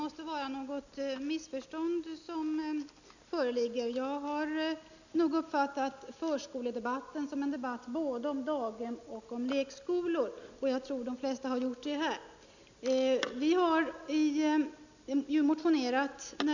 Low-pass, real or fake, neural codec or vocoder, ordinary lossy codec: 7.2 kHz; real; none; none